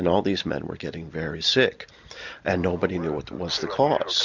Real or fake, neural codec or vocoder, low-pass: real; none; 7.2 kHz